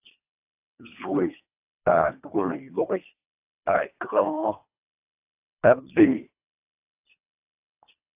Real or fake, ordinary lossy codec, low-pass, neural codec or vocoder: fake; AAC, 32 kbps; 3.6 kHz; codec, 24 kHz, 1.5 kbps, HILCodec